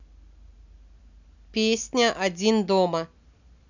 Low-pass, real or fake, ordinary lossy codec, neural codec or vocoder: 7.2 kHz; real; none; none